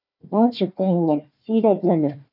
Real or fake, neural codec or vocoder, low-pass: fake; codec, 16 kHz, 1 kbps, FunCodec, trained on Chinese and English, 50 frames a second; 5.4 kHz